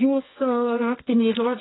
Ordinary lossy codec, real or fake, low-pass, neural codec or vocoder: AAC, 16 kbps; fake; 7.2 kHz; codec, 24 kHz, 0.9 kbps, WavTokenizer, medium music audio release